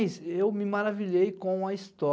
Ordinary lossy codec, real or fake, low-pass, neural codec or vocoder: none; real; none; none